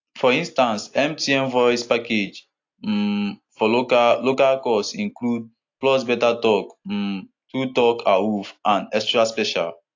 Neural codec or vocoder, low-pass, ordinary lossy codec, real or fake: none; 7.2 kHz; AAC, 48 kbps; real